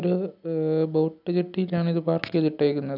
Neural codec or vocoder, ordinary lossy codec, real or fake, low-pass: none; none; real; 5.4 kHz